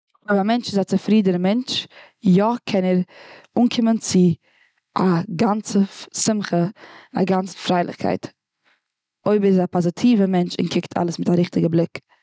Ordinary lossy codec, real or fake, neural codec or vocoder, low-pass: none; real; none; none